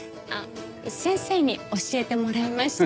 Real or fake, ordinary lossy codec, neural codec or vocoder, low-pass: real; none; none; none